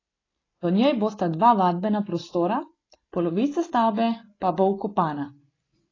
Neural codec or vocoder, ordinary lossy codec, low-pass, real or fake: none; AAC, 32 kbps; 7.2 kHz; real